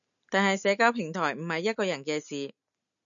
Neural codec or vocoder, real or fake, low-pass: none; real; 7.2 kHz